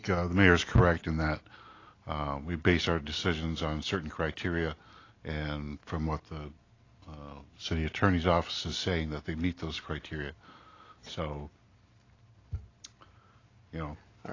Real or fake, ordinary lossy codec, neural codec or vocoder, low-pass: real; AAC, 32 kbps; none; 7.2 kHz